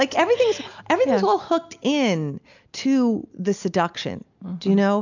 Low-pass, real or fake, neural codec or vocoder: 7.2 kHz; real; none